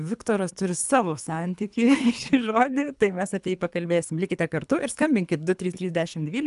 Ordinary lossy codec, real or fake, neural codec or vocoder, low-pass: AAC, 96 kbps; fake; codec, 24 kHz, 3 kbps, HILCodec; 10.8 kHz